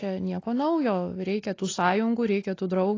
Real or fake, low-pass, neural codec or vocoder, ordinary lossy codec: real; 7.2 kHz; none; AAC, 32 kbps